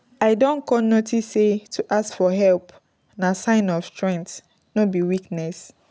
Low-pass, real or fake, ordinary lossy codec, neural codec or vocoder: none; real; none; none